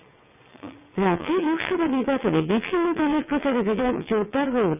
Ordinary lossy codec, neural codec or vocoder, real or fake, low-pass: none; vocoder, 22.05 kHz, 80 mel bands, WaveNeXt; fake; 3.6 kHz